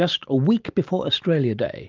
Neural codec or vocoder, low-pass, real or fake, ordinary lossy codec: none; 7.2 kHz; real; Opus, 32 kbps